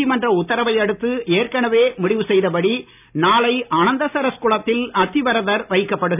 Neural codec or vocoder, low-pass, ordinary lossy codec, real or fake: none; 3.6 kHz; none; real